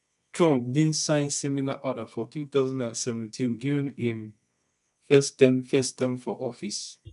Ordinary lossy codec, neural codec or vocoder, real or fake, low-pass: MP3, 96 kbps; codec, 24 kHz, 0.9 kbps, WavTokenizer, medium music audio release; fake; 10.8 kHz